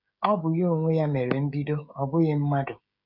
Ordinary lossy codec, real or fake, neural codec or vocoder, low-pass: none; fake; codec, 16 kHz, 16 kbps, FreqCodec, smaller model; 5.4 kHz